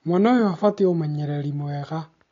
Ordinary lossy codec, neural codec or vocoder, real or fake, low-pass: AAC, 32 kbps; none; real; 7.2 kHz